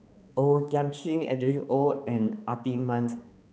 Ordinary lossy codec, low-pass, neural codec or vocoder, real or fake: none; none; codec, 16 kHz, 2 kbps, X-Codec, HuBERT features, trained on balanced general audio; fake